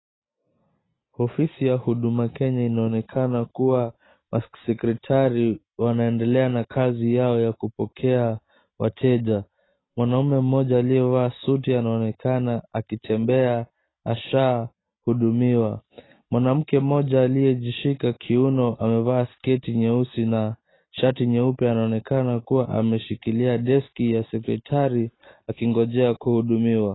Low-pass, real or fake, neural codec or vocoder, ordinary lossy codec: 7.2 kHz; real; none; AAC, 16 kbps